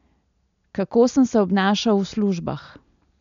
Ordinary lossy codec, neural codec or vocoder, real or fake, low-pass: none; none; real; 7.2 kHz